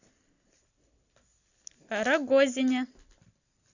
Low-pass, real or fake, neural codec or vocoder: 7.2 kHz; real; none